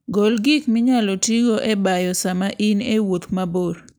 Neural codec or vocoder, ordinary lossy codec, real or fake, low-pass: none; none; real; none